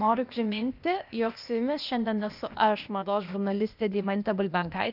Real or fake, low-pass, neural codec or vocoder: fake; 5.4 kHz; codec, 16 kHz, 0.8 kbps, ZipCodec